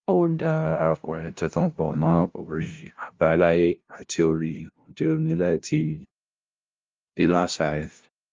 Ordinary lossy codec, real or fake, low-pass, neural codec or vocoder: Opus, 32 kbps; fake; 7.2 kHz; codec, 16 kHz, 0.5 kbps, FunCodec, trained on LibriTTS, 25 frames a second